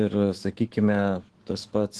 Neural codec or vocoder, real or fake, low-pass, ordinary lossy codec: autoencoder, 48 kHz, 128 numbers a frame, DAC-VAE, trained on Japanese speech; fake; 10.8 kHz; Opus, 16 kbps